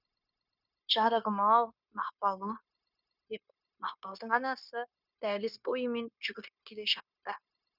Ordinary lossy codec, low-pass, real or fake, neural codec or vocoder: none; 5.4 kHz; fake; codec, 16 kHz, 0.9 kbps, LongCat-Audio-Codec